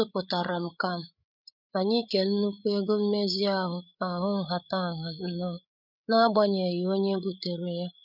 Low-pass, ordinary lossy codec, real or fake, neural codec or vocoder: 5.4 kHz; none; fake; codec, 16 kHz, 8 kbps, FreqCodec, larger model